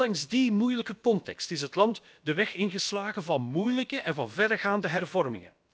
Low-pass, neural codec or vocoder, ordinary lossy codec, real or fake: none; codec, 16 kHz, about 1 kbps, DyCAST, with the encoder's durations; none; fake